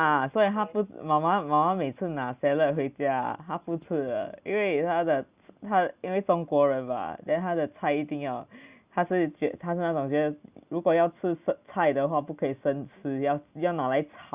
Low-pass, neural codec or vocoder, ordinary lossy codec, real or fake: 3.6 kHz; none; Opus, 64 kbps; real